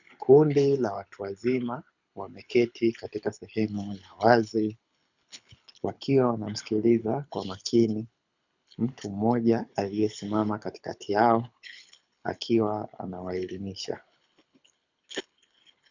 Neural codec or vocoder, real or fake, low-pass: codec, 24 kHz, 6 kbps, HILCodec; fake; 7.2 kHz